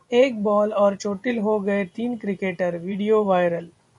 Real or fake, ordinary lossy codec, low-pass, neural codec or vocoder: fake; MP3, 64 kbps; 10.8 kHz; vocoder, 24 kHz, 100 mel bands, Vocos